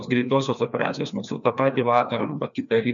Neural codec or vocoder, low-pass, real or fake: codec, 16 kHz, 2 kbps, FreqCodec, larger model; 7.2 kHz; fake